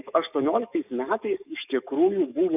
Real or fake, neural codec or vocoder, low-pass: fake; codec, 44.1 kHz, 7.8 kbps, DAC; 3.6 kHz